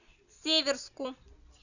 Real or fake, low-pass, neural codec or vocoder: real; 7.2 kHz; none